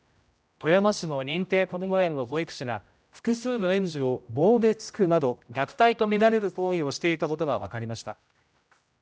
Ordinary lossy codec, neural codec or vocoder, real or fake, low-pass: none; codec, 16 kHz, 0.5 kbps, X-Codec, HuBERT features, trained on general audio; fake; none